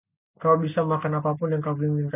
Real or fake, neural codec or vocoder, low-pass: real; none; 3.6 kHz